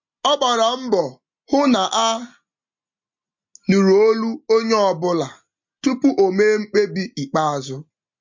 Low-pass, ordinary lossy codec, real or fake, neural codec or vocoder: 7.2 kHz; MP3, 48 kbps; real; none